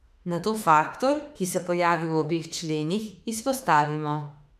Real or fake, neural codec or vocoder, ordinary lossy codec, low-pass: fake; autoencoder, 48 kHz, 32 numbers a frame, DAC-VAE, trained on Japanese speech; none; 14.4 kHz